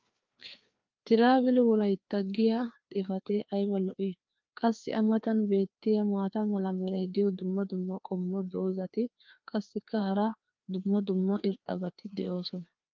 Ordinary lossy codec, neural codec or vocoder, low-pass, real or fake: Opus, 24 kbps; codec, 16 kHz, 2 kbps, FreqCodec, larger model; 7.2 kHz; fake